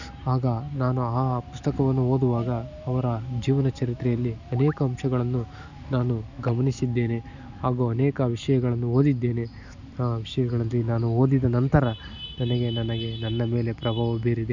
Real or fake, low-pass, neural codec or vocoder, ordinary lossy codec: real; 7.2 kHz; none; none